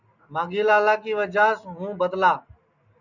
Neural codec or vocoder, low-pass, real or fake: none; 7.2 kHz; real